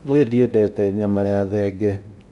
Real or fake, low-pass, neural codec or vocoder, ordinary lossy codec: fake; 10.8 kHz; codec, 16 kHz in and 24 kHz out, 0.6 kbps, FocalCodec, streaming, 2048 codes; none